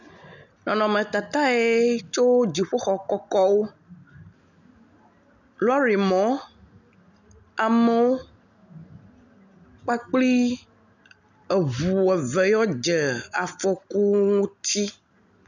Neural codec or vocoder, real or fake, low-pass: none; real; 7.2 kHz